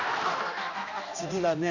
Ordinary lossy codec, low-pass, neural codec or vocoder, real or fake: none; 7.2 kHz; codec, 16 kHz, 1 kbps, X-Codec, HuBERT features, trained on general audio; fake